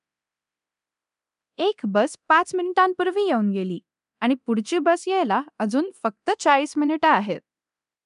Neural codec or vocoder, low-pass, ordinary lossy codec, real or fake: codec, 24 kHz, 0.9 kbps, DualCodec; 10.8 kHz; none; fake